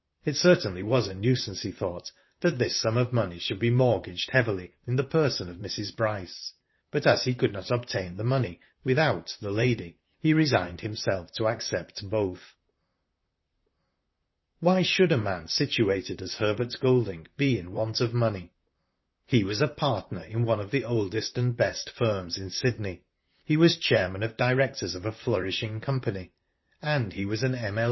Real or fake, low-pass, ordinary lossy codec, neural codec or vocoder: fake; 7.2 kHz; MP3, 24 kbps; vocoder, 44.1 kHz, 128 mel bands, Pupu-Vocoder